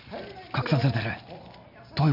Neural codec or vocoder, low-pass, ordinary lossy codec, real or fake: none; 5.4 kHz; none; real